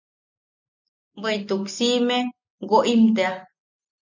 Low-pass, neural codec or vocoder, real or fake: 7.2 kHz; none; real